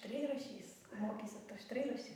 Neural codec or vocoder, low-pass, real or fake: vocoder, 44.1 kHz, 128 mel bands every 512 samples, BigVGAN v2; 19.8 kHz; fake